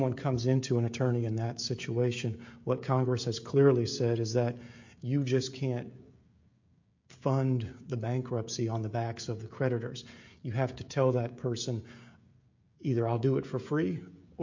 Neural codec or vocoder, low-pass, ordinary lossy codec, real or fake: codec, 16 kHz, 16 kbps, FreqCodec, smaller model; 7.2 kHz; MP3, 48 kbps; fake